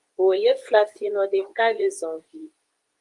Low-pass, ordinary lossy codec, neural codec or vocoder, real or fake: 10.8 kHz; Opus, 32 kbps; codec, 24 kHz, 0.9 kbps, WavTokenizer, medium speech release version 2; fake